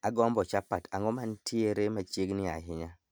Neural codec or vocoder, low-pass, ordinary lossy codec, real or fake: none; none; none; real